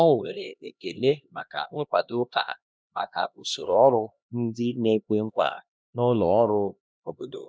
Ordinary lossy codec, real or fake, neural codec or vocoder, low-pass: none; fake; codec, 16 kHz, 1 kbps, X-Codec, HuBERT features, trained on LibriSpeech; none